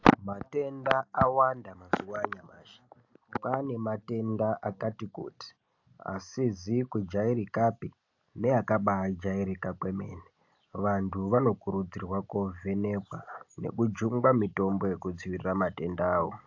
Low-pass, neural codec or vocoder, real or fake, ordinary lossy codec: 7.2 kHz; none; real; AAC, 48 kbps